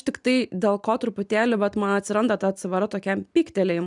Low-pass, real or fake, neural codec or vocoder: 10.8 kHz; real; none